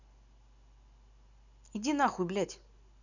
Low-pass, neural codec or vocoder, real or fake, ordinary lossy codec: 7.2 kHz; none; real; none